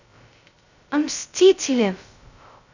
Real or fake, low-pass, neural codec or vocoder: fake; 7.2 kHz; codec, 16 kHz, 0.2 kbps, FocalCodec